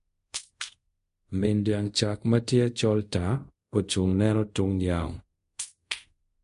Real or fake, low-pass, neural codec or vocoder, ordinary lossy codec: fake; 10.8 kHz; codec, 24 kHz, 0.5 kbps, DualCodec; MP3, 48 kbps